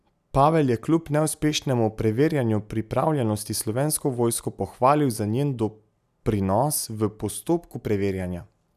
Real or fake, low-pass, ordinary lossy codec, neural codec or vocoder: real; 14.4 kHz; none; none